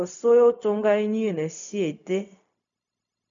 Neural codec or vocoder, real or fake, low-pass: codec, 16 kHz, 0.4 kbps, LongCat-Audio-Codec; fake; 7.2 kHz